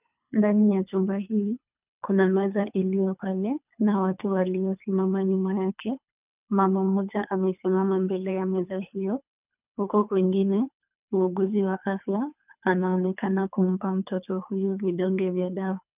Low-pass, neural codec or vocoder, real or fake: 3.6 kHz; codec, 24 kHz, 3 kbps, HILCodec; fake